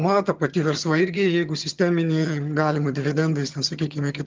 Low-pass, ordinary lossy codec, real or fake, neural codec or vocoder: 7.2 kHz; Opus, 32 kbps; fake; vocoder, 22.05 kHz, 80 mel bands, HiFi-GAN